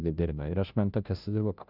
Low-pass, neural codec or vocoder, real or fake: 5.4 kHz; codec, 16 kHz, 0.5 kbps, FunCodec, trained on Chinese and English, 25 frames a second; fake